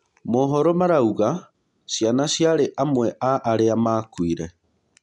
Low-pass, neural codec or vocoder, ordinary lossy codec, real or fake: 10.8 kHz; none; none; real